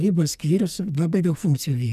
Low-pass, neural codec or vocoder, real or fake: 14.4 kHz; codec, 44.1 kHz, 2.6 kbps, SNAC; fake